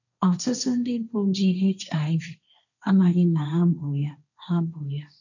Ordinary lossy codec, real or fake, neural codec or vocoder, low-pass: none; fake; codec, 16 kHz, 1.1 kbps, Voila-Tokenizer; 7.2 kHz